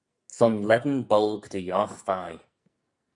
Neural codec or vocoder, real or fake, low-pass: codec, 44.1 kHz, 2.6 kbps, SNAC; fake; 10.8 kHz